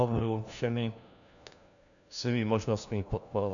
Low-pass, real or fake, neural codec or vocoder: 7.2 kHz; fake; codec, 16 kHz, 1 kbps, FunCodec, trained on LibriTTS, 50 frames a second